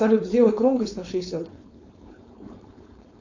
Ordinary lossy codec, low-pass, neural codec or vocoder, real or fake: AAC, 48 kbps; 7.2 kHz; codec, 16 kHz, 4.8 kbps, FACodec; fake